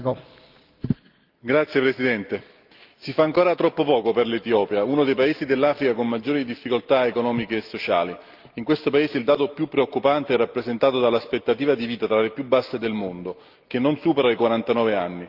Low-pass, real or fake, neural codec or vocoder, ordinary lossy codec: 5.4 kHz; real; none; Opus, 32 kbps